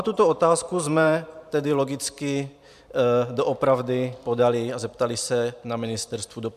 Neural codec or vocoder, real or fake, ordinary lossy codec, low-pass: vocoder, 44.1 kHz, 128 mel bands every 512 samples, BigVGAN v2; fake; MP3, 96 kbps; 14.4 kHz